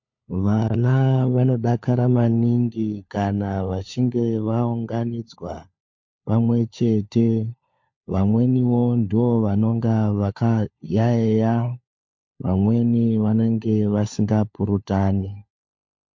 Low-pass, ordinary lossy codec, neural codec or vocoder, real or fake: 7.2 kHz; MP3, 48 kbps; codec, 16 kHz, 4 kbps, FunCodec, trained on LibriTTS, 50 frames a second; fake